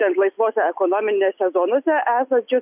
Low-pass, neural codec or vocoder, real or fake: 3.6 kHz; none; real